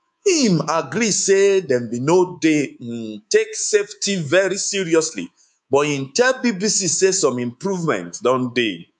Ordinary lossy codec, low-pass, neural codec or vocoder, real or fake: none; 10.8 kHz; codec, 24 kHz, 3.1 kbps, DualCodec; fake